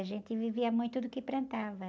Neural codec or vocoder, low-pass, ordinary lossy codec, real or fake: none; none; none; real